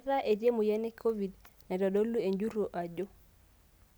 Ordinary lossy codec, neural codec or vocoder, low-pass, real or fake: none; none; none; real